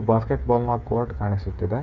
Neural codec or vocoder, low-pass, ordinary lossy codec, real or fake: codec, 16 kHz, 8 kbps, FreqCodec, smaller model; 7.2 kHz; Opus, 64 kbps; fake